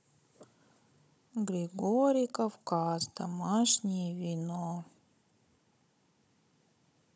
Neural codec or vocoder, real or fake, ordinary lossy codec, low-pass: codec, 16 kHz, 16 kbps, FunCodec, trained on Chinese and English, 50 frames a second; fake; none; none